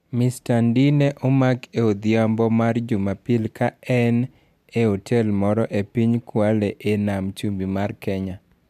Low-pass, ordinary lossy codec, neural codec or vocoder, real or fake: 14.4 kHz; MP3, 96 kbps; none; real